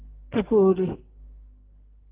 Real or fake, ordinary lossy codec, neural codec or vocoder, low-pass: fake; Opus, 16 kbps; codec, 44.1 kHz, 2.6 kbps, SNAC; 3.6 kHz